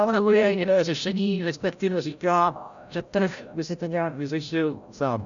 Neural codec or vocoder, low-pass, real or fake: codec, 16 kHz, 0.5 kbps, FreqCodec, larger model; 7.2 kHz; fake